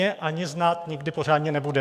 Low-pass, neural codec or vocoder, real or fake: 14.4 kHz; codec, 44.1 kHz, 7.8 kbps, DAC; fake